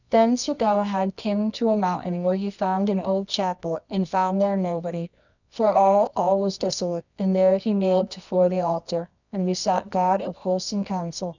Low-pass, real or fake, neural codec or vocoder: 7.2 kHz; fake; codec, 24 kHz, 0.9 kbps, WavTokenizer, medium music audio release